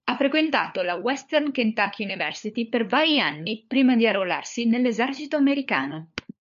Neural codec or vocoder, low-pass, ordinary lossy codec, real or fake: codec, 16 kHz, 4 kbps, FunCodec, trained on LibriTTS, 50 frames a second; 7.2 kHz; MP3, 48 kbps; fake